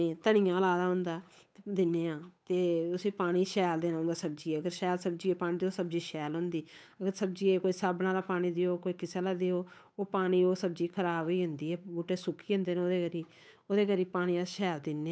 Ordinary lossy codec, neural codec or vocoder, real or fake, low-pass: none; codec, 16 kHz, 8 kbps, FunCodec, trained on Chinese and English, 25 frames a second; fake; none